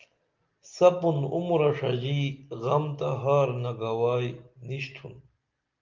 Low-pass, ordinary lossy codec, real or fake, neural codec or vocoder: 7.2 kHz; Opus, 24 kbps; real; none